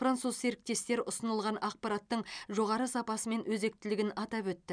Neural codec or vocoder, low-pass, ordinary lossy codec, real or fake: none; 9.9 kHz; none; real